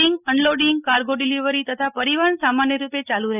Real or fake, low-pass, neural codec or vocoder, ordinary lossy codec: real; 3.6 kHz; none; none